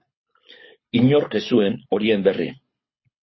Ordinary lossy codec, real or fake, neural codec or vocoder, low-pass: MP3, 24 kbps; fake; codec, 24 kHz, 6 kbps, HILCodec; 7.2 kHz